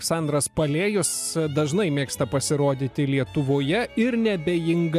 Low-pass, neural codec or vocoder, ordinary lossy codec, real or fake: 14.4 kHz; none; MP3, 96 kbps; real